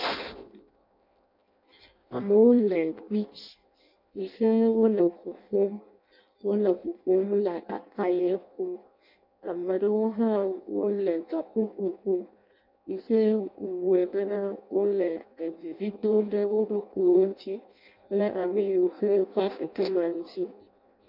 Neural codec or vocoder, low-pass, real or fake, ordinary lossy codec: codec, 16 kHz in and 24 kHz out, 0.6 kbps, FireRedTTS-2 codec; 5.4 kHz; fake; MP3, 48 kbps